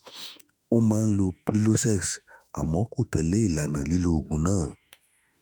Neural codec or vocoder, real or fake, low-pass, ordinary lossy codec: autoencoder, 48 kHz, 32 numbers a frame, DAC-VAE, trained on Japanese speech; fake; none; none